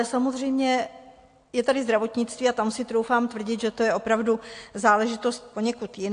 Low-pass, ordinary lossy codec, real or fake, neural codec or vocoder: 9.9 kHz; AAC, 48 kbps; real; none